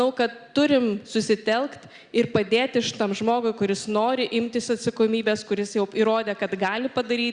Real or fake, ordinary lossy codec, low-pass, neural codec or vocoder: real; Opus, 64 kbps; 9.9 kHz; none